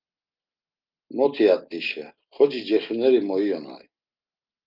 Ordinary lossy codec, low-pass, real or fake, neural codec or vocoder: Opus, 24 kbps; 5.4 kHz; real; none